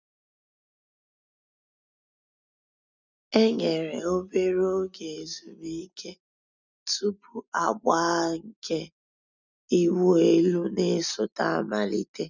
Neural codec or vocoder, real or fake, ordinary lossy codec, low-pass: none; real; none; 7.2 kHz